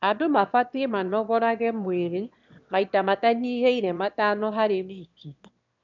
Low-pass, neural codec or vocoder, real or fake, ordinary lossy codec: 7.2 kHz; autoencoder, 22.05 kHz, a latent of 192 numbers a frame, VITS, trained on one speaker; fake; none